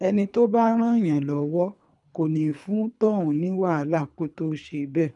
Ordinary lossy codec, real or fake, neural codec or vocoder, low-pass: none; fake; codec, 24 kHz, 3 kbps, HILCodec; 10.8 kHz